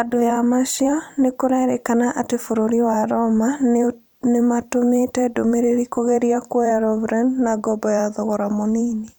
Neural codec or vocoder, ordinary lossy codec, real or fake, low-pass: vocoder, 44.1 kHz, 128 mel bands every 512 samples, BigVGAN v2; none; fake; none